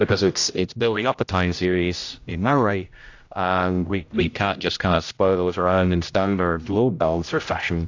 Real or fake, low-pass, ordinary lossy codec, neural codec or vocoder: fake; 7.2 kHz; AAC, 48 kbps; codec, 16 kHz, 0.5 kbps, X-Codec, HuBERT features, trained on general audio